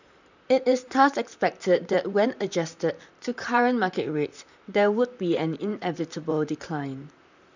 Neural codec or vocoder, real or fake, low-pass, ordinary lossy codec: vocoder, 44.1 kHz, 128 mel bands, Pupu-Vocoder; fake; 7.2 kHz; none